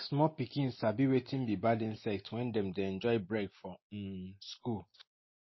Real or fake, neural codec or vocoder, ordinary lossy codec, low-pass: real; none; MP3, 24 kbps; 7.2 kHz